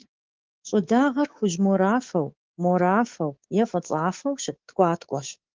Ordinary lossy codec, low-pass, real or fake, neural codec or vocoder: Opus, 16 kbps; 7.2 kHz; fake; codec, 24 kHz, 3.1 kbps, DualCodec